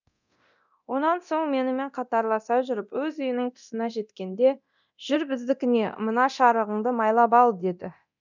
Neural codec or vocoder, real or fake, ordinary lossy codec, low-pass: codec, 24 kHz, 0.9 kbps, DualCodec; fake; none; 7.2 kHz